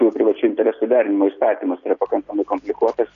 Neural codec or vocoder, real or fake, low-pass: none; real; 7.2 kHz